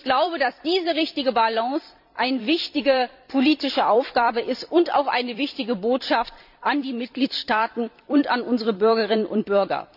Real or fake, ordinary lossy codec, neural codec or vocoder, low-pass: fake; none; vocoder, 44.1 kHz, 128 mel bands every 256 samples, BigVGAN v2; 5.4 kHz